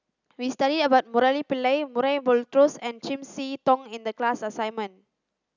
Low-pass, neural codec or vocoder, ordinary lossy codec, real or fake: 7.2 kHz; none; none; real